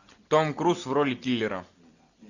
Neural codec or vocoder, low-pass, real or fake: none; 7.2 kHz; real